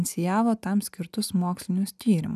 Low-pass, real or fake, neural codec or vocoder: 14.4 kHz; real; none